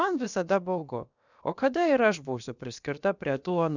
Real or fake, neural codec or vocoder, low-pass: fake; codec, 16 kHz, about 1 kbps, DyCAST, with the encoder's durations; 7.2 kHz